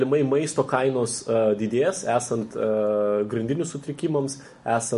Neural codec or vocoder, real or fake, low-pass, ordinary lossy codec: none; real; 14.4 kHz; MP3, 48 kbps